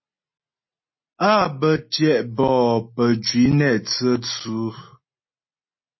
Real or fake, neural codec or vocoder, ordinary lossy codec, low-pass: real; none; MP3, 24 kbps; 7.2 kHz